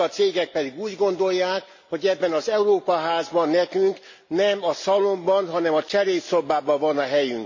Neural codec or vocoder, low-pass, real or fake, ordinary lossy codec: none; 7.2 kHz; real; MP3, 32 kbps